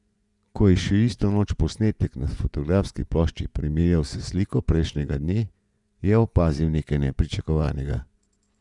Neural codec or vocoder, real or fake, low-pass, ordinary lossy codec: vocoder, 24 kHz, 100 mel bands, Vocos; fake; 10.8 kHz; none